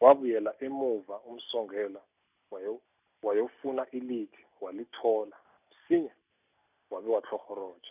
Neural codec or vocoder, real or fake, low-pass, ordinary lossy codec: none; real; 3.6 kHz; none